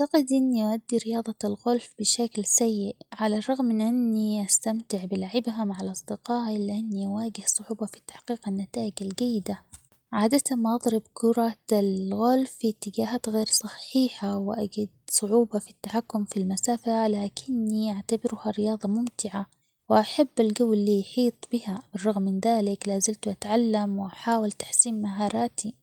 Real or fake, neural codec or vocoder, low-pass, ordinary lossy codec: real; none; 19.8 kHz; Opus, 32 kbps